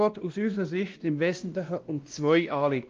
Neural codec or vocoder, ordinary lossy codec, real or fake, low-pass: codec, 16 kHz, 1 kbps, X-Codec, WavLM features, trained on Multilingual LibriSpeech; Opus, 24 kbps; fake; 7.2 kHz